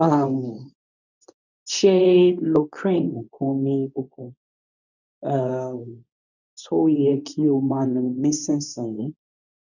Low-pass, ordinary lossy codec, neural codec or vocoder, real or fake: 7.2 kHz; none; codec, 24 kHz, 0.9 kbps, WavTokenizer, medium speech release version 2; fake